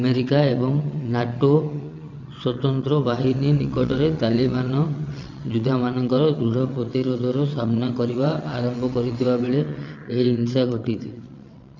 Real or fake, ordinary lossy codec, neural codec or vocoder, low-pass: fake; none; vocoder, 22.05 kHz, 80 mel bands, WaveNeXt; 7.2 kHz